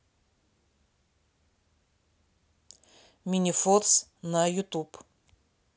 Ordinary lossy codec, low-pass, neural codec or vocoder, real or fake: none; none; none; real